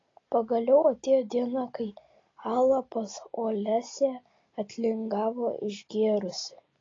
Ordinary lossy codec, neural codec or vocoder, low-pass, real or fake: AAC, 32 kbps; none; 7.2 kHz; real